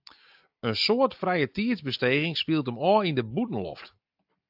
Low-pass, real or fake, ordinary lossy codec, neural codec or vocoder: 5.4 kHz; real; AAC, 48 kbps; none